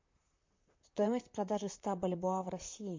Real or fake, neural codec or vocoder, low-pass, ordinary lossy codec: fake; vocoder, 44.1 kHz, 128 mel bands, Pupu-Vocoder; 7.2 kHz; MP3, 48 kbps